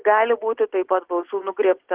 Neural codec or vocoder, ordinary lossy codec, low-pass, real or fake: none; Opus, 16 kbps; 3.6 kHz; real